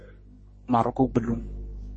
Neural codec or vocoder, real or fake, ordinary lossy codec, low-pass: codec, 44.1 kHz, 3.4 kbps, Pupu-Codec; fake; MP3, 32 kbps; 10.8 kHz